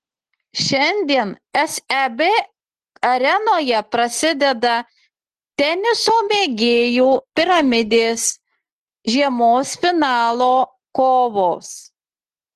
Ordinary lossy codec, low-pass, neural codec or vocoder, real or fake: Opus, 16 kbps; 10.8 kHz; none; real